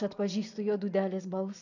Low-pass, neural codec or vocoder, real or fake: 7.2 kHz; none; real